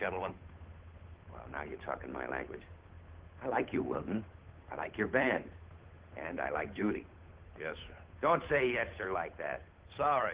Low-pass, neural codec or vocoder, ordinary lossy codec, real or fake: 3.6 kHz; codec, 16 kHz, 8 kbps, FunCodec, trained on Chinese and English, 25 frames a second; Opus, 16 kbps; fake